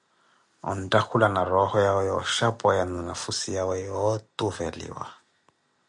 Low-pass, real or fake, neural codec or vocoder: 10.8 kHz; real; none